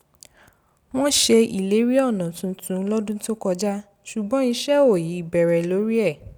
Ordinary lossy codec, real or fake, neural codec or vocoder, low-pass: none; real; none; none